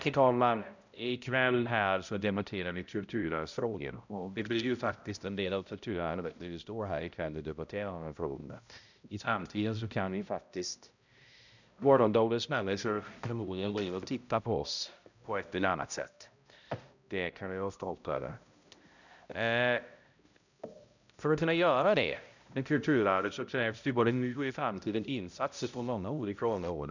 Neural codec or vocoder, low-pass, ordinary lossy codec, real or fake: codec, 16 kHz, 0.5 kbps, X-Codec, HuBERT features, trained on balanced general audio; 7.2 kHz; none; fake